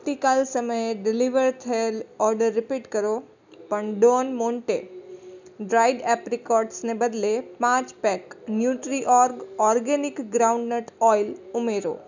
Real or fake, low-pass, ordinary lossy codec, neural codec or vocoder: real; 7.2 kHz; none; none